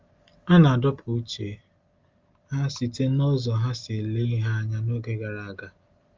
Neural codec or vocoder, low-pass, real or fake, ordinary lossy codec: none; 7.2 kHz; real; Opus, 32 kbps